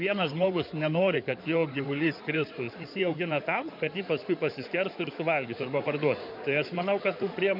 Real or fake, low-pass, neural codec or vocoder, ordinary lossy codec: fake; 5.4 kHz; codec, 16 kHz in and 24 kHz out, 2.2 kbps, FireRedTTS-2 codec; MP3, 48 kbps